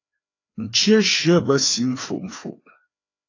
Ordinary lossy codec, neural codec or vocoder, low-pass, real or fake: AAC, 32 kbps; codec, 16 kHz, 2 kbps, FreqCodec, larger model; 7.2 kHz; fake